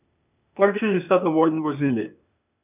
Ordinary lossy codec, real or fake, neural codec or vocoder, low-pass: none; fake; codec, 16 kHz, 0.8 kbps, ZipCodec; 3.6 kHz